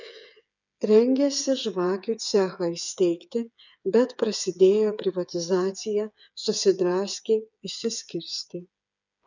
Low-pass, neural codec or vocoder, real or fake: 7.2 kHz; codec, 16 kHz, 8 kbps, FreqCodec, smaller model; fake